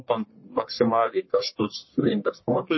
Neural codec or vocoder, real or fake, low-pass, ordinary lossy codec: codec, 44.1 kHz, 1.7 kbps, Pupu-Codec; fake; 7.2 kHz; MP3, 24 kbps